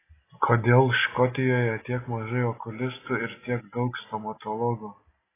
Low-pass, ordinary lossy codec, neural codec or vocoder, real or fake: 3.6 kHz; AAC, 16 kbps; none; real